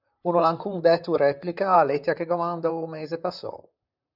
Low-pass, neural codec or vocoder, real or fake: 5.4 kHz; vocoder, 44.1 kHz, 128 mel bands, Pupu-Vocoder; fake